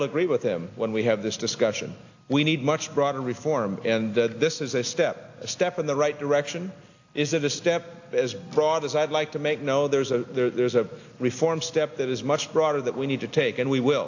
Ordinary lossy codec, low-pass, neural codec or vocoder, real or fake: AAC, 48 kbps; 7.2 kHz; none; real